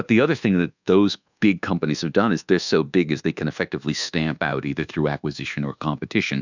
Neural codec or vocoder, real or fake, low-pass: codec, 24 kHz, 1.2 kbps, DualCodec; fake; 7.2 kHz